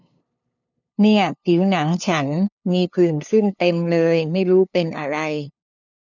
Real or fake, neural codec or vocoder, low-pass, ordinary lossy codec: fake; codec, 16 kHz, 2 kbps, FunCodec, trained on LibriTTS, 25 frames a second; 7.2 kHz; none